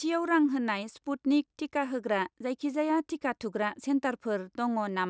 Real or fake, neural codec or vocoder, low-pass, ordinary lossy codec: real; none; none; none